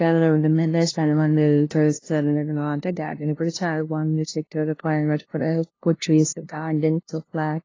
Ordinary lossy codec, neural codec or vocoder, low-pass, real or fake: AAC, 32 kbps; codec, 16 kHz, 0.5 kbps, FunCodec, trained on LibriTTS, 25 frames a second; 7.2 kHz; fake